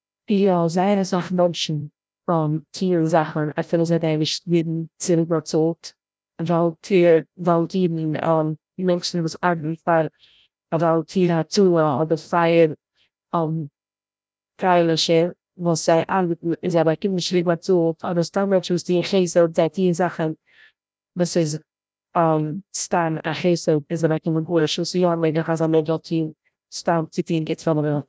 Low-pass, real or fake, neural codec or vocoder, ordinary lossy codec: none; fake; codec, 16 kHz, 0.5 kbps, FreqCodec, larger model; none